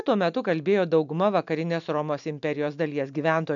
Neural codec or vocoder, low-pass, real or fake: none; 7.2 kHz; real